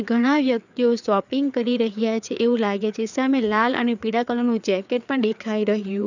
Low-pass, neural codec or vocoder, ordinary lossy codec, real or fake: 7.2 kHz; codec, 16 kHz, 4 kbps, FreqCodec, larger model; none; fake